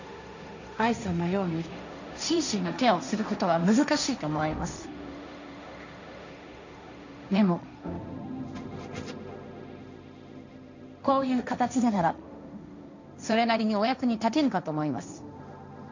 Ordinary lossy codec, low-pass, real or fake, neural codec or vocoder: none; 7.2 kHz; fake; codec, 16 kHz, 1.1 kbps, Voila-Tokenizer